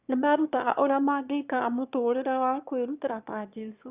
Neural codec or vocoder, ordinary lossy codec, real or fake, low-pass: autoencoder, 22.05 kHz, a latent of 192 numbers a frame, VITS, trained on one speaker; Opus, 64 kbps; fake; 3.6 kHz